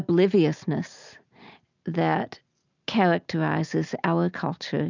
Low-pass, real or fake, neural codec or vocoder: 7.2 kHz; real; none